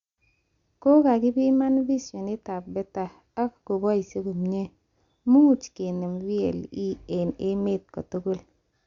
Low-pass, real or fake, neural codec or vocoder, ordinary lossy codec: 7.2 kHz; real; none; Opus, 64 kbps